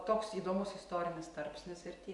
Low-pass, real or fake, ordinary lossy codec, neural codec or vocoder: 10.8 kHz; real; AAC, 64 kbps; none